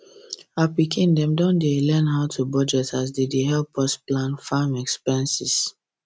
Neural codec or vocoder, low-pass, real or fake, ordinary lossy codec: none; none; real; none